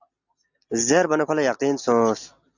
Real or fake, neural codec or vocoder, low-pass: real; none; 7.2 kHz